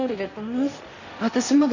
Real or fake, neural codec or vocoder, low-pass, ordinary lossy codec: fake; codec, 16 kHz, 1.1 kbps, Voila-Tokenizer; 7.2 kHz; none